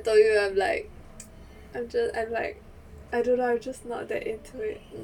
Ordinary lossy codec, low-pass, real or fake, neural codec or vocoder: none; 19.8 kHz; real; none